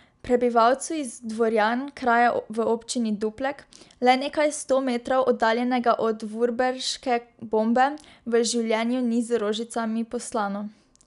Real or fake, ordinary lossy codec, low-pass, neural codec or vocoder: real; none; 10.8 kHz; none